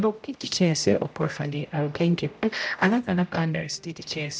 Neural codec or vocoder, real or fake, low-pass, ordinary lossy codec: codec, 16 kHz, 0.5 kbps, X-Codec, HuBERT features, trained on general audio; fake; none; none